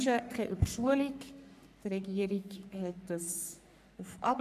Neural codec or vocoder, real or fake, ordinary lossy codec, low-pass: codec, 44.1 kHz, 3.4 kbps, Pupu-Codec; fake; none; 14.4 kHz